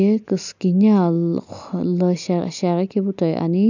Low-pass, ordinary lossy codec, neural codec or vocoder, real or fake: 7.2 kHz; none; none; real